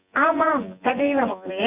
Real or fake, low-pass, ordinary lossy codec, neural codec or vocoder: fake; 3.6 kHz; Opus, 64 kbps; vocoder, 24 kHz, 100 mel bands, Vocos